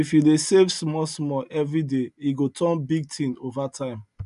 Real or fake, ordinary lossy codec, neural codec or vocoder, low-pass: real; none; none; 10.8 kHz